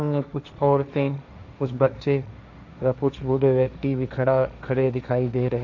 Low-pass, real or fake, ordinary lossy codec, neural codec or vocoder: 7.2 kHz; fake; none; codec, 16 kHz, 1.1 kbps, Voila-Tokenizer